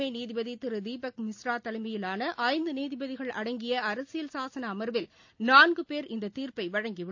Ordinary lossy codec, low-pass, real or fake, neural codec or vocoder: AAC, 48 kbps; 7.2 kHz; real; none